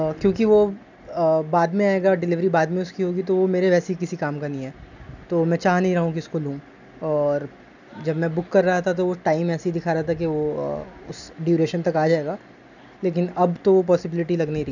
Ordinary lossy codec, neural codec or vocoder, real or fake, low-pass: none; none; real; 7.2 kHz